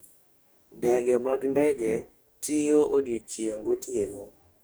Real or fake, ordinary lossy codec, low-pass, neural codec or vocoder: fake; none; none; codec, 44.1 kHz, 2.6 kbps, DAC